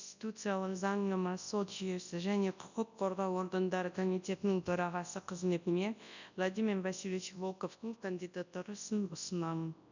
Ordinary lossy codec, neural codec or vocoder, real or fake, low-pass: none; codec, 24 kHz, 0.9 kbps, WavTokenizer, large speech release; fake; 7.2 kHz